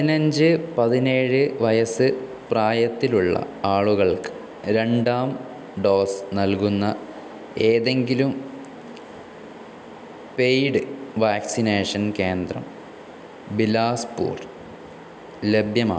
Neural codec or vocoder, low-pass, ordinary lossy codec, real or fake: none; none; none; real